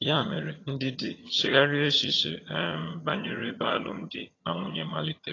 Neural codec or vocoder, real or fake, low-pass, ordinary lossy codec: vocoder, 22.05 kHz, 80 mel bands, HiFi-GAN; fake; 7.2 kHz; AAC, 32 kbps